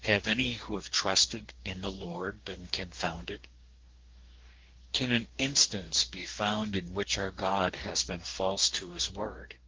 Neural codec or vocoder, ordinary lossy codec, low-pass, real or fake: codec, 44.1 kHz, 2.6 kbps, DAC; Opus, 16 kbps; 7.2 kHz; fake